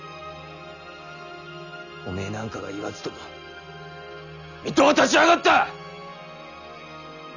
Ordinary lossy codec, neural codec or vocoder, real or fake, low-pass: none; none; real; 7.2 kHz